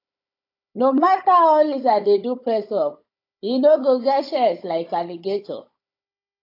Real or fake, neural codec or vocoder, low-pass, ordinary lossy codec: fake; codec, 16 kHz, 16 kbps, FunCodec, trained on Chinese and English, 50 frames a second; 5.4 kHz; AAC, 32 kbps